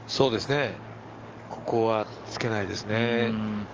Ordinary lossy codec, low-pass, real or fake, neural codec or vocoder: Opus, 24 kbps; 7.2 kHz; fake; codec, 44.1 kHz, 7.8 kbps, DAC